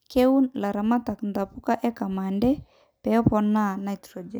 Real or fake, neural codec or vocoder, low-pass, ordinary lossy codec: real; none; none; none